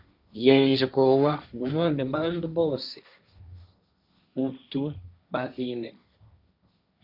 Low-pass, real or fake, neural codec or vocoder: 5.4 kHz; fake; codec, 16 kHz, 1.1 kbps, Voila-Tokenizer